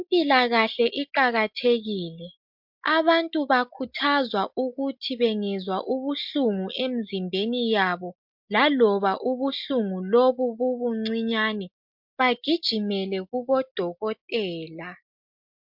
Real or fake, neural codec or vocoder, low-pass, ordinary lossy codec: real; none; 5.4 kHz; MP3, 48 kbps